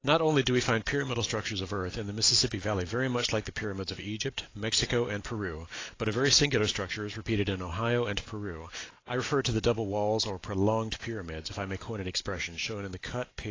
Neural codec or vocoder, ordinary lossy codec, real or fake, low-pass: none; AAC, 32 kbps; real; 7.2 kHz